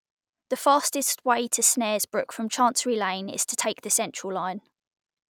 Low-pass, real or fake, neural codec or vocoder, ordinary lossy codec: none; real; none; none